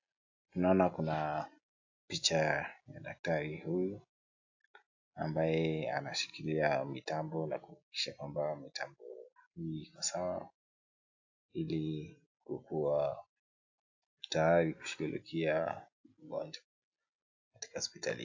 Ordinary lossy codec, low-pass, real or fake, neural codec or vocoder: AAC, 48 kbps; 7.2 kHz; real; none